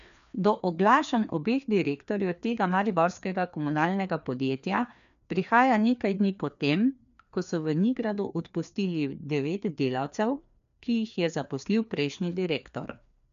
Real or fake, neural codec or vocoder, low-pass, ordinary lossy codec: fake; codec, 16 kHz, 2 kbps, FreqCodec, larger model; 7.2 kHz; none